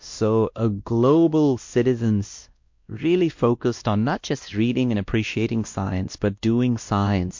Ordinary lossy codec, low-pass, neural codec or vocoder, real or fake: MP3, 48 kbps; 7.2 kHz; codec, 16 kHz, 1 kbps, X-Codec, WavLM features, trained on Multilingual LibriSpeech; fake